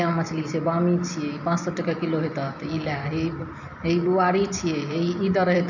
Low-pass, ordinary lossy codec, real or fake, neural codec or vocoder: 7.2 kHz; none; real; none